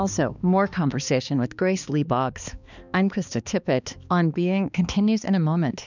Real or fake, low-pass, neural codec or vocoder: fake; 7.2 kHz; codec, 16 kHz, 2 kbps, X-Codec, HuBERT features, trained on balanced general audio